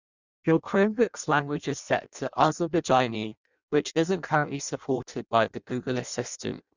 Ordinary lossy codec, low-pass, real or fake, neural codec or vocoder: Opus, 64 kbps; 7.2 kHz; fake; codec, 16 kHz in and 24 kHz out, 0.6 kbps, FireRedTTS-2 codec